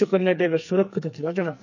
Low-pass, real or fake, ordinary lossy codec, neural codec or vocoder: 7.2 kHz; fake; none; codec, 44.1 kHz, 2.6 kbps, SNAC